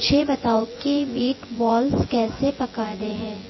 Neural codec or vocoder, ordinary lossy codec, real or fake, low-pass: vocoder, 24 kHz, 100 mel bands, Vocos; MP3, 24 kbps; fake; 7.2 kHz